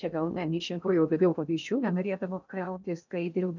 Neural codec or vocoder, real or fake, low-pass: codec, 16 kHz in and 24 kHz out, 0.6 kbps, FocalCodec, streaming, 4096 codes; fake; 7.2 kHz